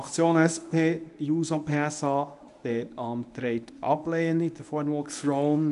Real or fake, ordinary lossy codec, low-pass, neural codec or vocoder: fake; none; 10.8 kHz; codec, 24 kHz, 0.9 kbps, WavTokenizer, medium speech release version 1